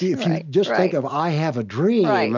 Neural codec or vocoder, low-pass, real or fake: none; 7.2 kHz; real